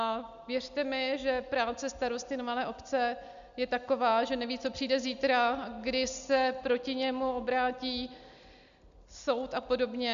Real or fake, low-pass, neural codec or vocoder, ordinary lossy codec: real; 7.2 kHz; none; MP3, 96 kbps